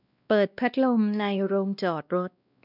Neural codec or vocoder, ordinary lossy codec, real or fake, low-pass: codec, 16 kHz, 1 kbps, X-Codec, WavLM features, trained on Multilingual LibriSpeech; none; fake; 5.4 kHz